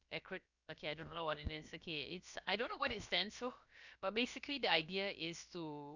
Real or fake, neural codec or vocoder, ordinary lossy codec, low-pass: fake; codec, 16 kHz, about 1 kbps, DyCAST, with the encoder's durations; none; 7.2 kHz